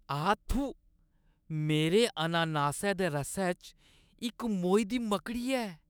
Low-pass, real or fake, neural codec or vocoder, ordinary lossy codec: none; fake; autoencoder, 48 kHz, 128 numbers a frame, DAC-VAE, trained on Japanese speech; none